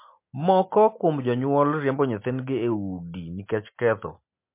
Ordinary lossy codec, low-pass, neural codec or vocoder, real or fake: MP3, 24 kbps; 3.6 kHz; none; real